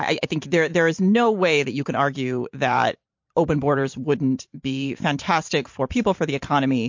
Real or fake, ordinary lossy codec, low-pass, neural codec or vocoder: fake; MP3, 48 kbps; 7.2 kHz; vocoder, 44.1 kHz, 128 mel bands every 512 samples, BigVGAN v2